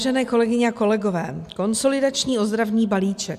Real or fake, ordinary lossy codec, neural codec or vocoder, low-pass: real; MP3, 96 kbps; none; 14.4 kHz